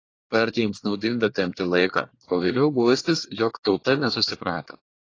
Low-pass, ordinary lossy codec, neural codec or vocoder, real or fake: 7.2 kHz; AAC, 32 kbps; codec, 24 kHz, 1 kbps, SNAC; fake